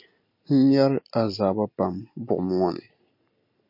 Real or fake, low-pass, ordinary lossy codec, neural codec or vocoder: real; 5.4 kHz; MP3, 32 kbps; none